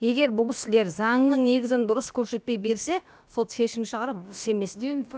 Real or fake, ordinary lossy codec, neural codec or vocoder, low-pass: fake; none; codec, 16 kHz, about 1 kbps, DyCAST, with the encoder's durations; none